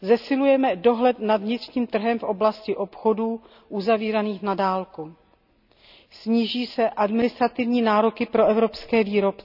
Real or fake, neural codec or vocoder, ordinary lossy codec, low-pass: real; none; none; 5.4 kHz